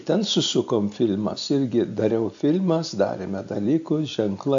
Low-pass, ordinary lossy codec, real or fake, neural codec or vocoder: 7.2 kHz; AAC, 64 kbps; real; none